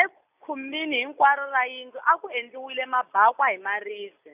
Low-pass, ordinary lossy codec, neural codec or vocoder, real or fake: 3.6 kHz; none; none; real